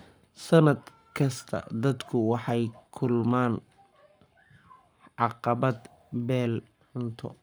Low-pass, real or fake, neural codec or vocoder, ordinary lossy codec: none; fake; codec, 44.1 kHz, 7.8 kbps, Pupu-Codec; none